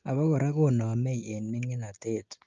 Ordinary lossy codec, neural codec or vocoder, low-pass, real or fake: Opus, 32 kbps; none; 7.2 kHz; real